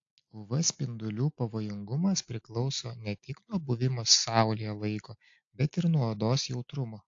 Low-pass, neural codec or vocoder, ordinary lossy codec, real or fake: 7.2 kHz; none; AAC, 48 kbps; real